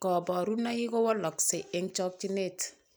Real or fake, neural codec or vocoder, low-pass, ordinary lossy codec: real; none; none; none